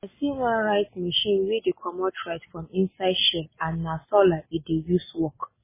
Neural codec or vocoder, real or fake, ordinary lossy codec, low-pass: none; real; MP3, 16 kbps; 3.6 kHz